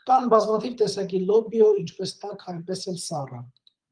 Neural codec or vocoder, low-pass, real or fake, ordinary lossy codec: codec, 24 kHz, 6 kbps, HILCodec; 9.9 kHz; fake; Opus, 32 kbps